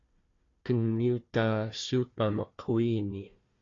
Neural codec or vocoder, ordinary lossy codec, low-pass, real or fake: codec, 16 kHz, 1 kbps, FunCodec, trained on Chinese and English, 50 frames a second; AAC, 32 kbps; 7.2 kHz; fake